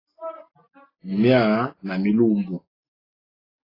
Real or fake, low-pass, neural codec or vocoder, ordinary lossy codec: real; 5.4 kHz; none; AAC, 24 kbps